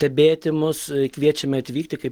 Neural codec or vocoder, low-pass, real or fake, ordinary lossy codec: none; 19.8 kHz; real; Opus, 16 kbps